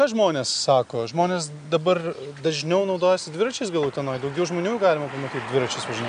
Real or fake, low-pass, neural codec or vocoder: real; 9.9 kHz; none